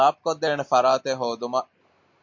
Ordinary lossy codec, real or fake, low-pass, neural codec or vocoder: MP3, 48 kbps; real; 7.2 kHz; none